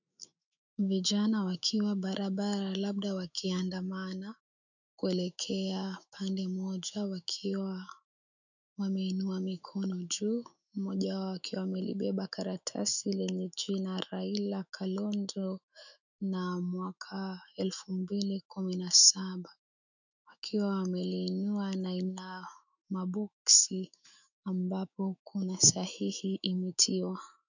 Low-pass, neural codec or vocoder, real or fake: 7.2 kHz; autoencoder, 48 kHz, 128 numbers a frame, DAC-VAE, trained on Japanese speech; fake